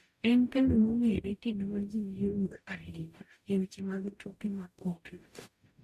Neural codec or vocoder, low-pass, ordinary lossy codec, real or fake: codec, 44.1 kHz, 0.9 kbps, DAC; 14.4 kHz; MP3, 64 kbps; fake